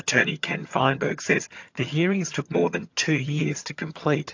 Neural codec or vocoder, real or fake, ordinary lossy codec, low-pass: vocoder, 22.05 kHz, 80 mel bands, HiFi-GAN; fake; AAC, 48 kbps; 7.2 kHz